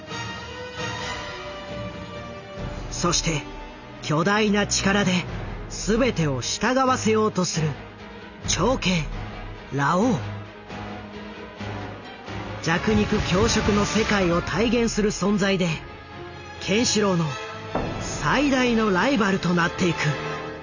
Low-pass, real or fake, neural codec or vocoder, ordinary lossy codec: 7.2 kHz; real; none; none